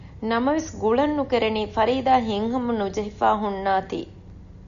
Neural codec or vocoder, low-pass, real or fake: none; 7.2 kHz; real